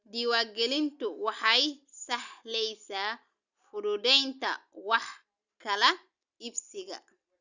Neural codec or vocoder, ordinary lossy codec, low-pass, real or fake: none; Opus, 64 kbps; 7.2 kHz; real